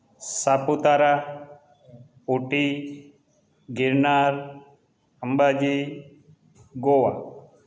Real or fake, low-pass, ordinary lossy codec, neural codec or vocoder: real; none; none; none